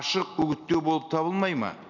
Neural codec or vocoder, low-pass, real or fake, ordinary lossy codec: none; 7.2 kHz; real; none